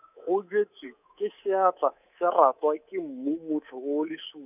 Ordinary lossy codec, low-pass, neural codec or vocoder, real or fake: none; 3.6 kHz; codec, 24 kHz, 3.1 kbps, DualCodec; fake